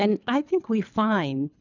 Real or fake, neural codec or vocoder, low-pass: fake; codec, 24 kHz, 3 kbps, HILCodec; 7.2 kHz